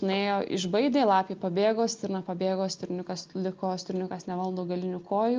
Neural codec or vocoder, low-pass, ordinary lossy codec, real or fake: none; 7.2 kHz; Opus, 24 kbps; real